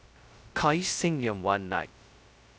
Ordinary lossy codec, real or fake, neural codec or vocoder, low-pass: none; fake; codec, 16 kHz, 0.2 kbps, FocalCodec; none